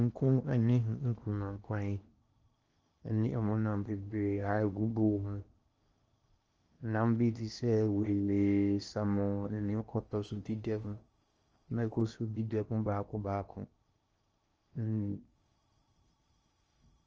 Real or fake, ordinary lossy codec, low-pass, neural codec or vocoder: fake; Opus, 24 kbps; 7.2 kHz; codec, 16 kHz in and 24 kHz out, 0.8 kbps, FocalCodec, streaming, 65536 codes